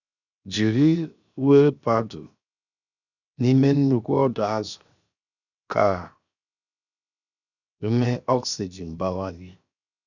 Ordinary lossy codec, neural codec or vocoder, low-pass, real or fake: none; codec, 16 kHz, 0.7 kbps, FocalCodec; 7.2 kHz; fake